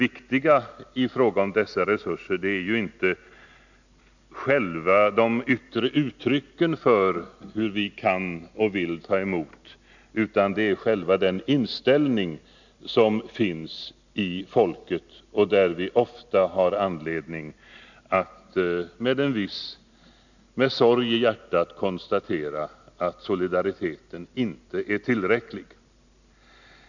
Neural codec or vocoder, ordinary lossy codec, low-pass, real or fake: none; none; 7.2 kHz; real